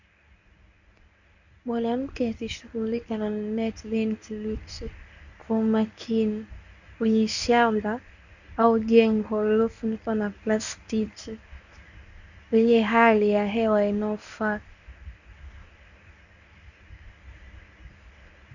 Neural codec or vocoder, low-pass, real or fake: codec, 24 kHz, 0.9 kbps, WavTokenizer, medium speech release version 1; 7.2 kHz; fake